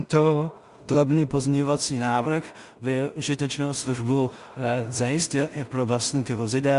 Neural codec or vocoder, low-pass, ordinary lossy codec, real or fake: codec, 16 kHz in and 24 kHz out, 0.4 kbps, LongCat-Audio-Codec, two codebook decoder; 10.8 kHz; Opus, 64 kbps; fake